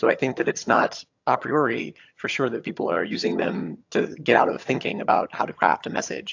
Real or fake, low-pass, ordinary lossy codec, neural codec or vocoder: fake; 7.2 kHz; AAC, 48 kbps; vocoder, 22.05 kHz, 80 mel bands, HiFi-GAN